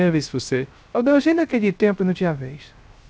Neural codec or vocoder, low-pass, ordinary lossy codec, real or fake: codec, 16 kHz, 0.3 kbps, FocalCodec; none; none; fake